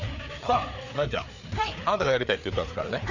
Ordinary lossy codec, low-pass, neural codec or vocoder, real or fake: none; 7.2 kHz; codec, 16 kHz, 4 kbps, FreqCodec, larger model; fake